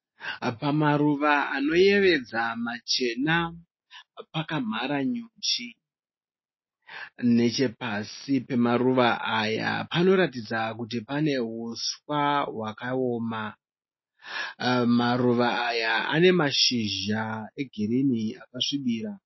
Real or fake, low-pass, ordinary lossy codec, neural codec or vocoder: real; 7.2 kHz; MP3, 24 kbps; none